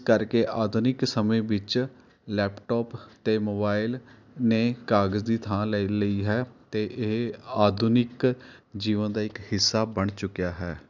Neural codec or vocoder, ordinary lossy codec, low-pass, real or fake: none; none; 7.2 kHz; real